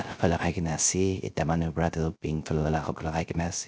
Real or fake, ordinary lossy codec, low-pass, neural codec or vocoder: fake; none; none; codec, 16 kHz, 0.3 kbps, FocalCodec